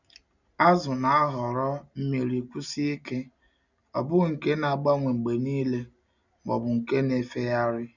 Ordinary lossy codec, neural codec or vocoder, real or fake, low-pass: none; none; real; 7.2 kHz